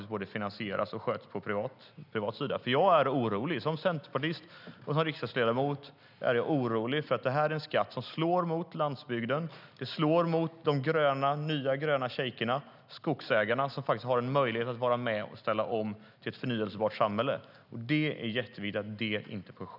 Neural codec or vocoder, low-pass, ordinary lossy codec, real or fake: none; 5.4 kHz; none; real